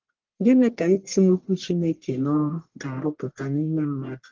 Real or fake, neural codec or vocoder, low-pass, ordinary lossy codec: fake; codec, 44.1 kHz, 1.7 kbps, Pupu-Codec; 7.2 kHz; Opus, 16 kbps